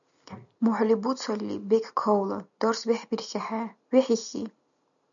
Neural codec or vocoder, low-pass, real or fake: none; 7.2 kHz; real